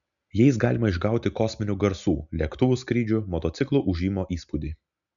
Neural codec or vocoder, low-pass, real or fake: none; 7.2 kHz; real